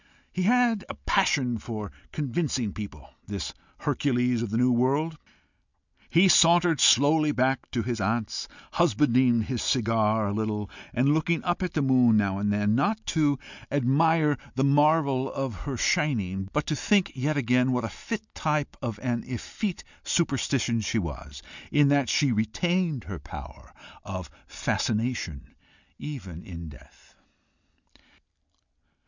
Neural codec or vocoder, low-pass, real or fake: none; 7.2 kHz; real